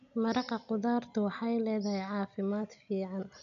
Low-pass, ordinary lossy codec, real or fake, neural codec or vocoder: 7.2 kHz; none; real; none